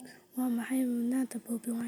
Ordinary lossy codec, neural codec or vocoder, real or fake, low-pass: none; none; real; none